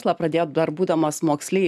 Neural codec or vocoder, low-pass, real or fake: none; 14.4 kHz; real